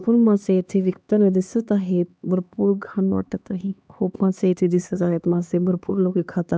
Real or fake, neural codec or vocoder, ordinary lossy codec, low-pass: fake; codec, 16 kHz, 2 kbps, X-Codec, HuBERT features, trained on LibriSpeech; none; none